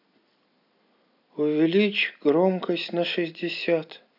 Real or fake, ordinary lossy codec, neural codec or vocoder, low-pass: real; none; none; 5.4 kHz